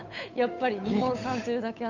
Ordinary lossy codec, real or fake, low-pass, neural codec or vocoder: none; fake; 7.2 kHz; codec, 16 kHz, 8 kbps, FunCodec, trained on Chinese and English, 25 frames a second